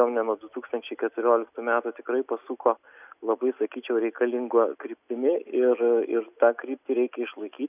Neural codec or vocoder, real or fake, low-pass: none; real; 3.6 kHz